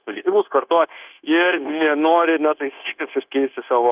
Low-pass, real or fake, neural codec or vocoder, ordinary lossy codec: 3.6 kHz; fake; codec, 16 kHz, 0.9 kbps, LongCat-Audio-Codec; Opus, 24 kbps